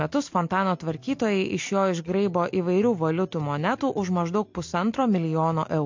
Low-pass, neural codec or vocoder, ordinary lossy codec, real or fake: 7.2 kHz; none; MP3, 48 kbps; real